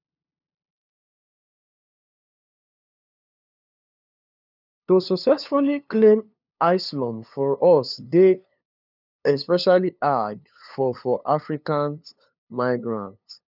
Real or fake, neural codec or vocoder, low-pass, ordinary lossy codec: fake; codec, 16 kHz, 2 kbps, FunCodec, trained on LibriTTS, 25 frames a second; 5.4 kHz; none